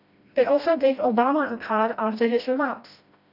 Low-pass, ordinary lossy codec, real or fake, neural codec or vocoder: 5.4 kHz; none; fake; codec, 16 kHz, 1 kbps, FreqCodec, smaller model